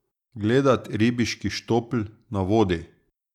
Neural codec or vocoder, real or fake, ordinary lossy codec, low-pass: none; real; none; 19.8 kHz